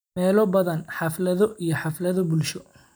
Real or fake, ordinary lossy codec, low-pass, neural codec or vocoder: real; none; none; none